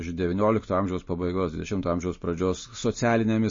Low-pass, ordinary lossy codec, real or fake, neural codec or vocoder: 7.2 kHz; MP3, 32 kbps; real; none